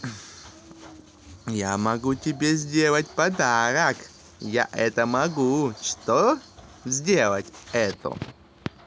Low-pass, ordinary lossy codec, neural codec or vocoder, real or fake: none; none; none; real